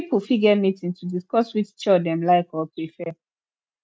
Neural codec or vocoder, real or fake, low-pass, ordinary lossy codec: none; real; none; none